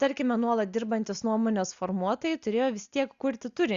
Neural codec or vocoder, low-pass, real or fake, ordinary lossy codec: none; 7.2 kHz; real; Opus, 64 kbps